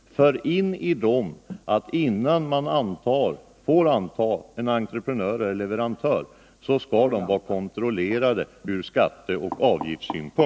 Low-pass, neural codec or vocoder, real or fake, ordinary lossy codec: none; none; real; none